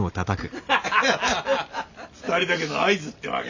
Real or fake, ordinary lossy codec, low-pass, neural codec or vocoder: real; none; 7.2 kHz; none